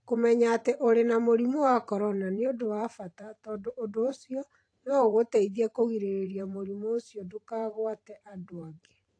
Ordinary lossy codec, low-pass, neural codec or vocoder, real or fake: AAC, 48 kbps; 9.9 kHz; vocoder, 44.1 kHz, 128 mel bands every 512 samples, BigVGAN v2; fake